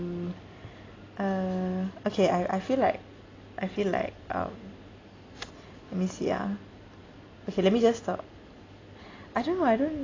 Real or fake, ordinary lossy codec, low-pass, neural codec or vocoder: real; AAC, 32 kbps; 7.2 kHz; none